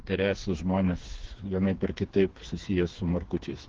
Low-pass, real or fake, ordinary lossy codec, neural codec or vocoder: 7.2 kHz; fake; Opus, 16 kbps; codec, 16 kHz, 4 kbps, FreqCodec, smaller model